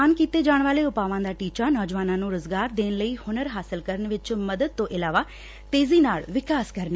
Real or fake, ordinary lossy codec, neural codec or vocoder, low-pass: real; none; none; none